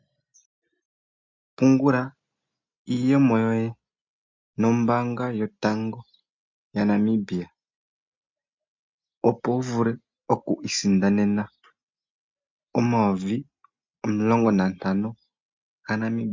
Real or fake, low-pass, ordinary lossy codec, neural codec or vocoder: real; 7.2 kHz; MP3, 64 kbps; none